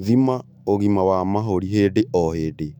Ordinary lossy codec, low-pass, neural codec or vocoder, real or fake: none; 19.8 kHz; autoencoder, 48 kHz, 128 numbers a frame, DAC-VAE, trained on Japanese speech; fake